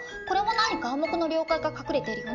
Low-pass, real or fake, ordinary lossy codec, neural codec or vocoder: 7.2 kHz; real; none; none